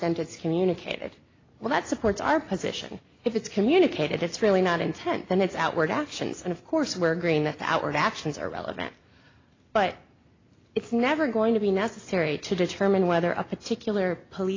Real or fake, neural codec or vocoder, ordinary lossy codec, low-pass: real; none; AAC, 32 kbps; 7.2 kHz